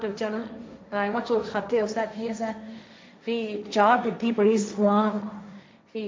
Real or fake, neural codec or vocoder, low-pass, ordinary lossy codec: fake; codec, 16 kHz, 1.1 kbps, Voila-Tokenizer; none; none